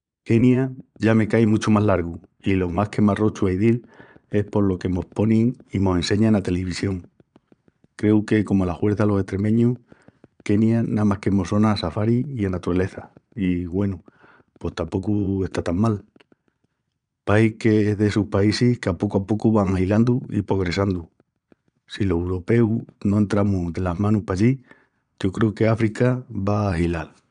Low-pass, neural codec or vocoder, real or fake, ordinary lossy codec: 9.9 kHz; vocoder, 22.05 kHz, 80 mel bands, Vocos; fake; none